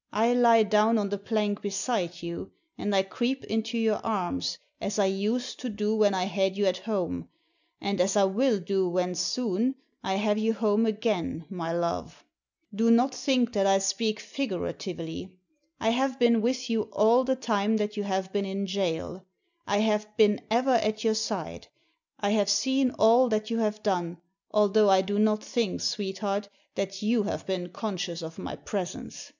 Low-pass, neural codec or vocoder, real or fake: 7.2 kHz; none; real